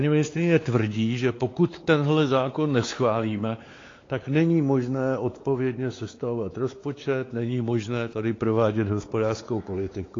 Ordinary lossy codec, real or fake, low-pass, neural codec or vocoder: AAC, 32 kbps; fake; 7.2 kHz; codec, 16 kHz, 4 kbps, X-Codec, WavLM features, trained on Multilingual LibriSpeech